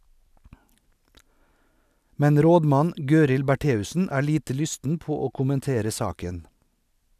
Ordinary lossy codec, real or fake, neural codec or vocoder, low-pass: none; real; none; 14.4 kHz